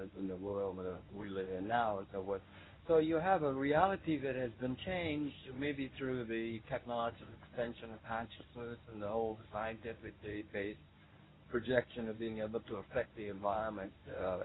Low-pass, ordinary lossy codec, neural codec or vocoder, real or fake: 7.2 kHz; AAC, 16 kbps; codec, 24 kHz, 0.9 kbps, WavTokenizer, medium speech release version 1; fake